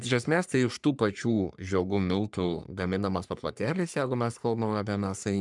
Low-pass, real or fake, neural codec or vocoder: 10.8 kHz; fake; codec, 44.1 kHz, 3.4 kbps, Pupu-Codec